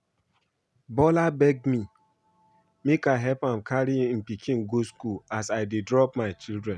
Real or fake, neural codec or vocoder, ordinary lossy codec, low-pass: real; none; none; none